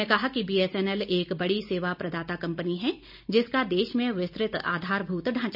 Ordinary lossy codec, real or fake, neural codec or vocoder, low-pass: none; real; none; 5.4 kHz